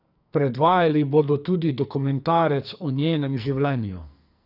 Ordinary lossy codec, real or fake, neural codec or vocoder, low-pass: none; fake; codec, 44.1 kHz, 2.6 kbps, SNAC; 5.4 kHz